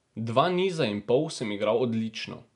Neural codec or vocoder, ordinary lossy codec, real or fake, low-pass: none; none; real; 10.8 kHz